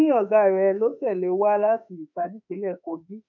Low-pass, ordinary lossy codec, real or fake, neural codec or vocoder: 7.2 kHz; none; fake; autoencoder, 48 kHz, 32 numbers a frame, DAC-VAE, trained on Japanese speech